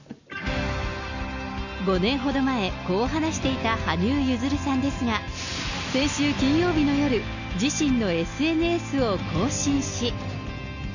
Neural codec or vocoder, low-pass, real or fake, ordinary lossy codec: none; 7.2 kHz; real; none